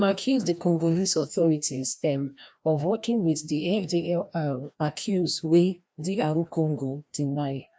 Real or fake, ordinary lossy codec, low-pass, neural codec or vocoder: fake; none; none; codec, 16 kHz, 1 kbps, FreqCodec, larger model